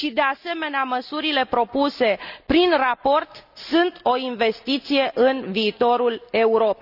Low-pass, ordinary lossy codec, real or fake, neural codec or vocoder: 5.4 kHz; none; real; none